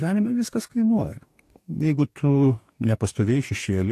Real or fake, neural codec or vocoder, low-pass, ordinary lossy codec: fake; codec, 44.1 kHz, 2.6 kbps, DAC; 14.4 kHz; AAC, 64 kbps